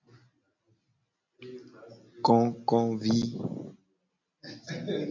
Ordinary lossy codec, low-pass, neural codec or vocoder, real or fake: MP3, 64 kbps; 7.2 kHz; none; real